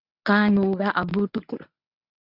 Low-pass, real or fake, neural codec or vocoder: 5.4 kHz; fake; codec, 24 kHz, 0.9 kbps, WavTokenizer, medium speech release version 1